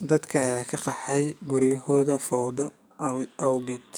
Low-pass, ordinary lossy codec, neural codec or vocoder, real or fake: none; none; codec, 44.1 kHz, 2.6 kbps, SNAC; fake